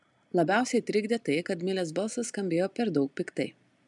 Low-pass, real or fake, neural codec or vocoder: 10.8 kHz; real; none